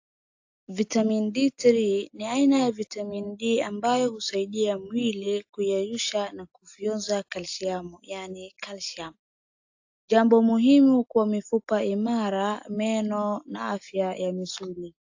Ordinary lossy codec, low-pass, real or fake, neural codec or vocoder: AAC, 48 kbps; 7.2 kHz; real; none